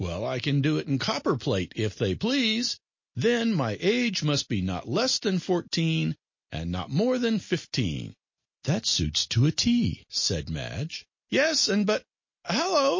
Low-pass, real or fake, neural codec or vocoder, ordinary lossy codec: 7.2 kHz; real; none; MP3, 32 kbps